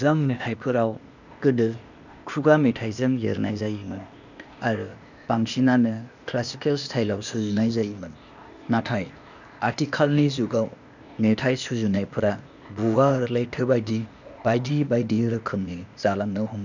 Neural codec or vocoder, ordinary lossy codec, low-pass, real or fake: codec, 16 kHz, 0.8 kbps, ZipCodec; none; 7.2 kHz; fake